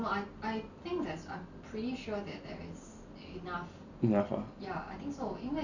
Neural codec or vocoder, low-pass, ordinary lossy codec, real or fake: none; 7.2 kHz; none; real